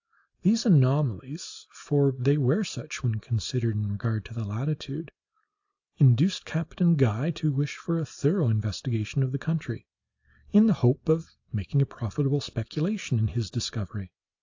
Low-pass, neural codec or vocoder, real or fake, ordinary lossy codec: 7.2 kHz; none; real; AAC, 48 kbps